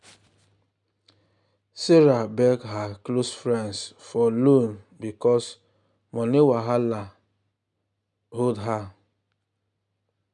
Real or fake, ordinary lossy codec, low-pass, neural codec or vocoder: real; none; 10.8 kHz; none